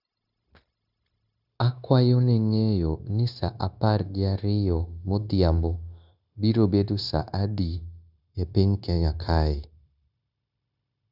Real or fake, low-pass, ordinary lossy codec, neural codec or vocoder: fake; 5.4 kHz; none; codec, 16 kHz, 0.9 kbps, LongCat-Audio-Codec